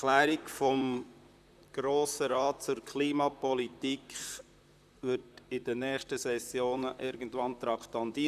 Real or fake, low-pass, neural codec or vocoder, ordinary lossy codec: fake; 14.4 kHz; vocoder, 44.1 kHz, 128 mel bands, Pupu-Vocoder; none